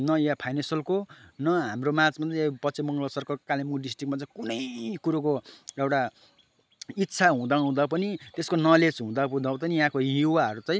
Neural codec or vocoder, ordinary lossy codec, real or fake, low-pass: none; none; real; none